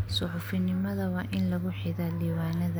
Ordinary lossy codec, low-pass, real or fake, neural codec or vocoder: none; none; real; none